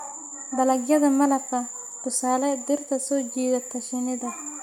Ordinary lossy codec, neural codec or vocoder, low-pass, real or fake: none; none; 19.8 kHz; real